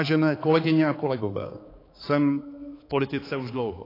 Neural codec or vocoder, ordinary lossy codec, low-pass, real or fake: codec, 16 kHz, 4 kbps, X-Codec, HuBERT features, trained on balanced general audio; AAC, 24 kbps; 5.4 kHz; fake